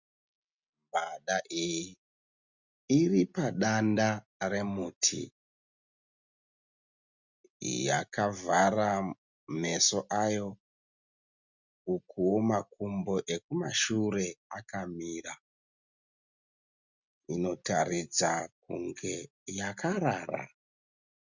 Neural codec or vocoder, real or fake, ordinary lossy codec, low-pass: none; real; Opus, 64 kbps; 7.2 kHz